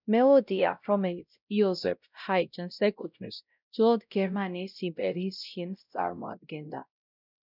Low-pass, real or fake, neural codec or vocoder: 5.4 kHz; fake; codec, 16 kHz, 0.5 kbps, X-Codec, WavLM features, trained on Multilingual LibriSpeech